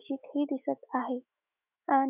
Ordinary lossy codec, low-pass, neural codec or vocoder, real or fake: none; 3.6 kHz; autoencoder, 48 kHz, 128 numbers a frame, DAC-VAE, trained on Japanese speech; fake